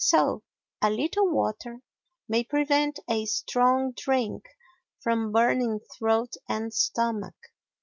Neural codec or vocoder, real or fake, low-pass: none; real; 7.2 kHz